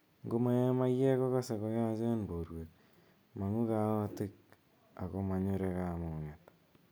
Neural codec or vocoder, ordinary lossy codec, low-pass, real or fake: none; none; none; real